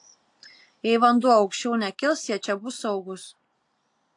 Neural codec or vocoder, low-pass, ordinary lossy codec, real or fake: none; 10.8 kHz; AAC, 64 kbps; real